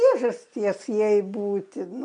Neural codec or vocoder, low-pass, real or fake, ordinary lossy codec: none; 9.9 kHz; real; MP3, 64 kbps